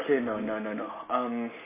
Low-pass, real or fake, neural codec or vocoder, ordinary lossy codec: 3.6 kHz; real; none; MP3, 16 kbps